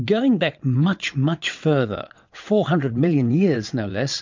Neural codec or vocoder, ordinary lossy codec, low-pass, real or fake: vocoder, 22.05 kHz, 80 mel bands, WaveNeXt; MP3, 64 kbps; 7.2 kHz; fake